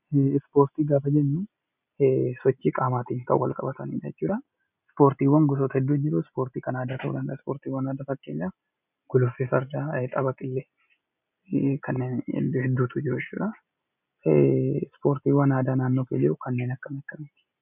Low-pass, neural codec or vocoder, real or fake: 3.6 kHz; none; real